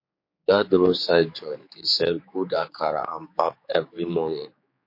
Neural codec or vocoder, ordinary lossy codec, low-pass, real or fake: codec, 16 kHz, 4 kbps, X-Codec, HuBERT features, trained on general audio; MP3, 32 kbps; 5.4 kHz; fake